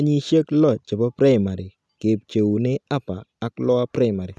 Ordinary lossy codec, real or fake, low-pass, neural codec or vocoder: none; real; none; none